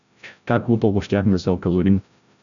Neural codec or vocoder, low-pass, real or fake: codec, 16 kHz, 0.5 kbps, FreqCodec, larger model; 7.2 kHz; fake